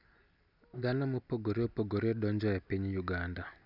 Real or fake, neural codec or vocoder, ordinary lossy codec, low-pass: real; none; none; 5.4 kHz